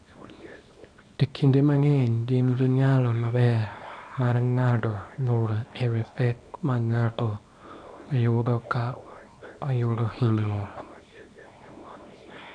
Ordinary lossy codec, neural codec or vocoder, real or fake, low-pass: none; codec, 24 kHz, 0.9 kbps, WavTokenizer, small release; fake; 9.9 kHz